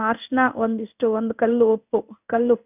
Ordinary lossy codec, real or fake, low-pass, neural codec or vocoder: none; fake; 3.6 kHz; codec, 16 kHz in and 24 kHz out, 1 kbps, XY-Tokenizer